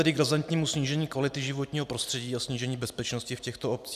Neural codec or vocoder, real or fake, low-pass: none; real; 14.4 kHz